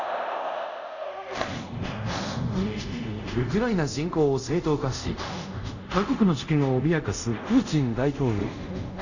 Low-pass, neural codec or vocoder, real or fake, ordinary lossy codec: 7.2 kHz; codec, 24 kHz, 0.5 kbps, DualCodec; fake; none